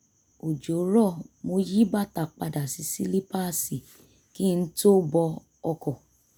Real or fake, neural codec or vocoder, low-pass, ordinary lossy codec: real; none; none; none